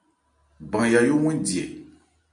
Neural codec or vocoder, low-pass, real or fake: none; 9.9 kHz; real